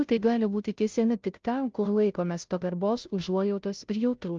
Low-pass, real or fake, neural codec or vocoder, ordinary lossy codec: 7.2 kHz; fake; codec, 16 kHz, 0.5 kbps, FunCodec, trained on Chinese and English, 25 frames a second; Opus, 24 kbps